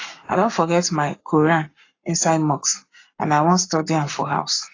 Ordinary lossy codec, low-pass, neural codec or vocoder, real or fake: AAC, 32 kbps; 7.2 kHz; codec, 44.1 kHz, 7.8 kbps, Pupu-Codec; fake